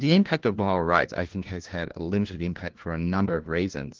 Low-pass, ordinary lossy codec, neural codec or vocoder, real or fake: 7.2 kHz; Opus, 16 kbps; codec, 16 kHz, 1 kbps, FunCodec, trained on LibriTTS, 50 frames a second; fake